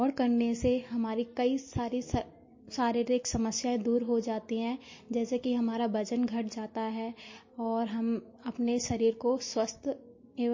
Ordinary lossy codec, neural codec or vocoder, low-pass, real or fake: MP3, 32 kbps; none; 7.2 kHz; real